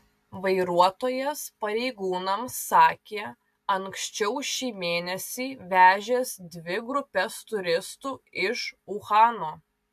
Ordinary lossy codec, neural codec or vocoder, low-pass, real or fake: AAC, 96 kbps; none; 14.4 kHz; real